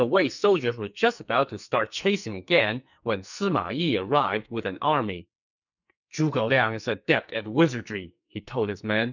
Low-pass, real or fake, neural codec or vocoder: 7.2 kHz; fake; codec, 44.1 kHz, 2.6 kbps, SNAC